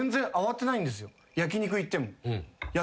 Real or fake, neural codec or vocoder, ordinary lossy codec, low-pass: real; none; none; none